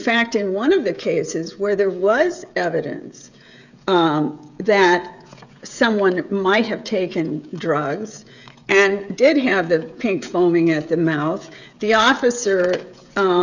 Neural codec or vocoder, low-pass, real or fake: codec, 16 kHz, 16 kbps, FreqCodec, smaller model; 7.2 kHz; fake